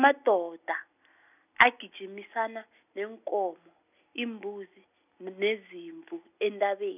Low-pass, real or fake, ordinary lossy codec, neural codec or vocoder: 3.6 kHz; real; none; none